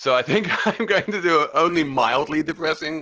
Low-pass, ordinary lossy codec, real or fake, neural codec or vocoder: 7.2 kHz; Opus, 16 kbps; real; none